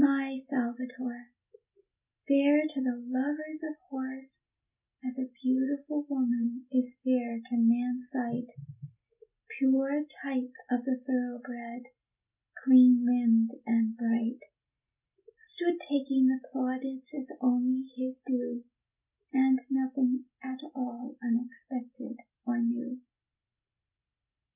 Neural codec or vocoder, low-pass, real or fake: none; 3.6 kHz; real